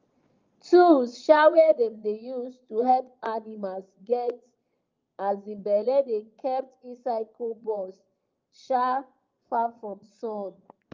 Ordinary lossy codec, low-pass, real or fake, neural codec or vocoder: Opus, 24 kbps; 7.2 kHz; fake; vocoder, 22.05 kHz, 80 mel bands, Vocos